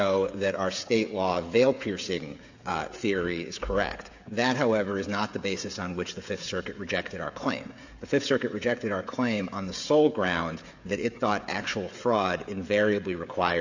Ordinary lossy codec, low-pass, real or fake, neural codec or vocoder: AAC, 48 kbps; 7.2 kHz; fake; codec, 16 kHz, 16 kbps, FreqCodec, smaller model